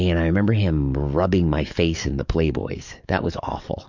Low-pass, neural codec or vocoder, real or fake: 7.2 kHz; codec, 44.1 kHz, 7.8 kbps, DAC; fake